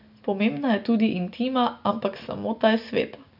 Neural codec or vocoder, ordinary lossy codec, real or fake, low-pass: none; none; real; 5.4 kHz